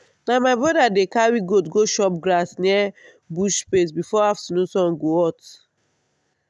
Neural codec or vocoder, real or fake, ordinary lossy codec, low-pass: none; real; none; none